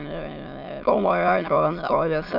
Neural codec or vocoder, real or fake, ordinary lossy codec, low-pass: autoencoder, 22.05 kHz, a latent of 192 numbers a frame, VITS, trained on many speakers; fake; none; 5.4 kHz